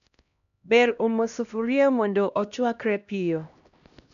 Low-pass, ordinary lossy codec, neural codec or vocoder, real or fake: 7.2 kHz; none; codec, 16 kHz, 1 kbps, X-Codec, HuBERT features, trained on LibriSpeech; fake